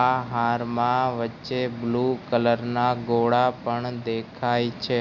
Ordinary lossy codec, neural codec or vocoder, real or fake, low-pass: none; none; real; 7.2 kHz